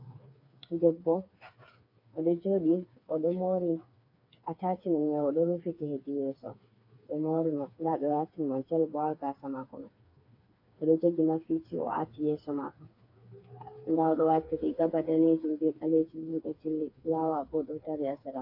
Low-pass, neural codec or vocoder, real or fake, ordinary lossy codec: 5.4 kHz; codec, 16 kHz, 4 kbps, FreqCodec, smaller model; fake; AAC, 32 kbps